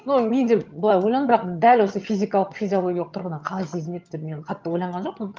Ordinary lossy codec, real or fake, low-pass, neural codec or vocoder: Opus, 32 kbps; fake; 7.2 kHz; vocoder, 22.05 kHz, 80 mel bands, HiFi-GAN